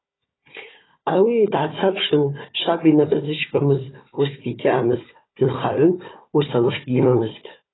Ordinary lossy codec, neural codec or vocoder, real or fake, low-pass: AAC, 16 kbps; codec, 16 kHz, 4 kbps, FunCodec, trained on Chinese and English, 50 frames a second; fake; 7.2 kHz